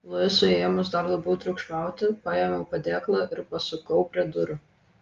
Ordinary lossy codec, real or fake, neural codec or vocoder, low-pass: Opus, 32 kbps; real; none; 7.2 kHz